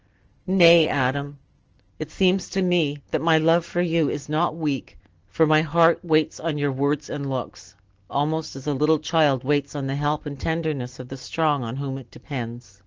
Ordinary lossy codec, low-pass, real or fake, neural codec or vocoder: Opus, 16 kbps; 7.2 kHz; real; none